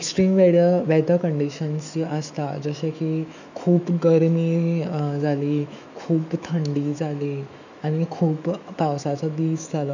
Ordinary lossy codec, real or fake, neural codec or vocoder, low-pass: none; fake; autoencoder, 48 kHz, 128 numbers a frame, DAC-VAE, trained on Japanese speech; 7.2 kHz